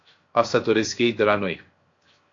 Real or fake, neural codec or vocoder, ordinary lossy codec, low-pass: fake; codec, 16 kHz, 0.7 kbps, FocalCodec; AAC, 48 kbps; 7.2 kHz